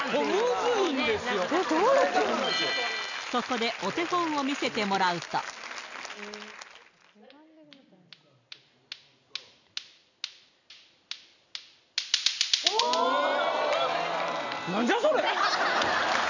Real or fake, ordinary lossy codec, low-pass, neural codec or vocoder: real; none; 7.2 kHz; none